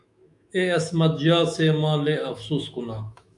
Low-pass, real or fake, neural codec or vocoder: 10.8 kHz; fake; autoencoder, 48 kHz, 128 numbers a frame, DAC-VAE, trained on Japanese speech